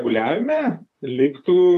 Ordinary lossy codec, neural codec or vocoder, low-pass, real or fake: AAC, 64 kbps; vocoder, 44.1 kHz, 128 mel bands, Pupu-Vocoder; 14.4 kHz; fake